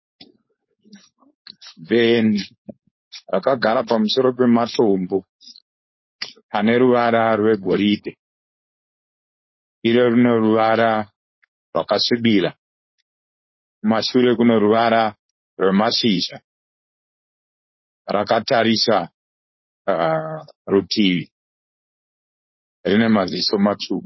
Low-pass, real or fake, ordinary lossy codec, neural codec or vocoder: 7.2 kHz; fake; MP3, 24 kbps; codec, 16 kHz, 4.8 kbps, FACodec